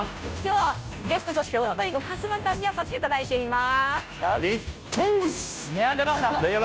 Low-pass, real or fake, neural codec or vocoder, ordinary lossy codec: none; fake; codec, 16 kHz, 0.5 kbps, FunCodec, trained on Chinese and English, 25 frames a second; none